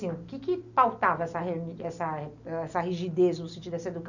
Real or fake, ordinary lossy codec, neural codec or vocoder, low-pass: real; none; none; 7.2 kHz